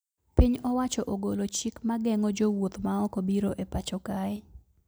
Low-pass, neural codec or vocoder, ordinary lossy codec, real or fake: none; none; none; real